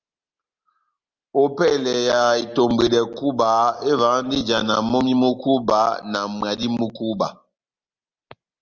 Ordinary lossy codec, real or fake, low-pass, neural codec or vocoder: Opus, 32 kbps; real; 7.2 kHz; none